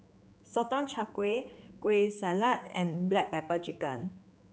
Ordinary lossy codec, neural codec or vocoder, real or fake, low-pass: none; codec, 16 kHz, 4 kbps, X-Codec, HuBERT features, trained on general audio; fake; none